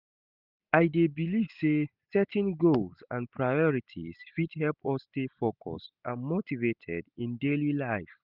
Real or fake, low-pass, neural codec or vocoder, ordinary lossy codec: real; 5.4 kHz; none; none